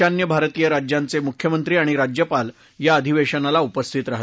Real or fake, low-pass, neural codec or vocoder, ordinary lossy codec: real; none; none; none